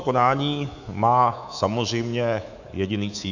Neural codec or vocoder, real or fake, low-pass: codec, 24 kHz, 3.1 kbps, DualCodec; fake; 7.2 kHz